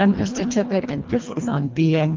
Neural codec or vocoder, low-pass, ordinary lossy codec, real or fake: codec, 24 kHz, 1.5 kbps, HILCodec; 7.2 kHz; Opus, 24 kbps; fake